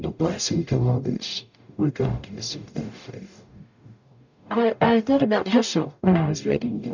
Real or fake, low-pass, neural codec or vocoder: fake; 7.2 kHz; codec, 44.1 kHz, 0.9 kbps, DAC